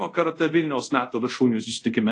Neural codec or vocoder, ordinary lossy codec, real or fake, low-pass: codec, 24 kHz, 0.5 kbps, DualCodec; AAC, 48 kbps; fake; 10.8 kHz